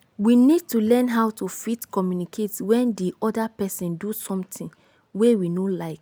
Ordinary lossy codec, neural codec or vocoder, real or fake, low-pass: none; none; real; none